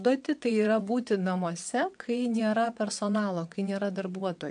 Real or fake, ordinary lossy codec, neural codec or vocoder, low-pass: fake; MP3, 64 kbps; vocoder, 22.05 kHz, 80 mel bands, WaveNeXt; 9.9 kHz